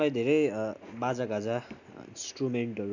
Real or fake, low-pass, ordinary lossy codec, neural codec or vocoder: real; 7.2 kHz; none; none